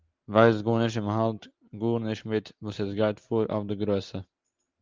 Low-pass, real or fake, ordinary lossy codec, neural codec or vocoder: 7.2 kHz; real; Opus, 32 kbps; none